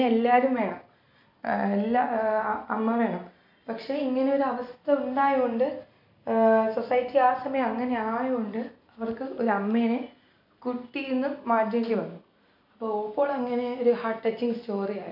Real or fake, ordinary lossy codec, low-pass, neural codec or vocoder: real; none; 5.4 kHz; none